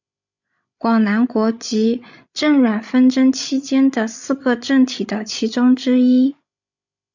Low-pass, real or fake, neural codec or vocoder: 7.2 kHz; fake; codec, 16 kHz, 16 kbps, FreqCodec, larger model